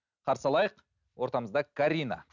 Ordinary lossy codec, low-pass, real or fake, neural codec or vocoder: none; 7.2 kHz; real; none